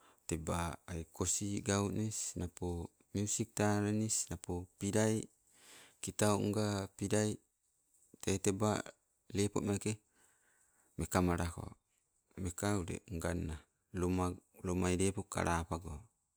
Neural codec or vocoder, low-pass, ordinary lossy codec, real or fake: none; none; none; real